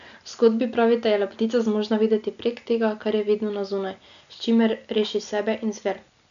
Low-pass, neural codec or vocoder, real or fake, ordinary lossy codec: 7.2 kHz; none; real; none